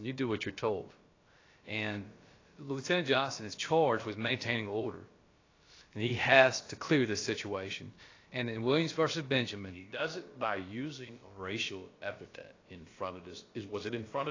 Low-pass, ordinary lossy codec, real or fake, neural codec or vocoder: 7.2 kHz; AAC, 32 kbps; fake; codec, 16 kHz, about 1 kbps, DyCAST, with the encoder's durations